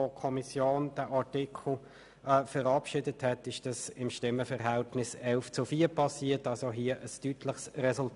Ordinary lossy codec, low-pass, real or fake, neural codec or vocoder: none; 10.8 kHz; real; none